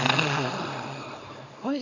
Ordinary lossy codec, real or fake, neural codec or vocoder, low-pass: MP3, 48 kbps; fake; codec, 24 kHz, 0.9 kbps, WavTokenizer, small release; 7.2 kHz